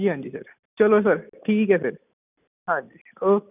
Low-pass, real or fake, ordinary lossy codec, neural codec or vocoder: 3.6 kHz; real; none; none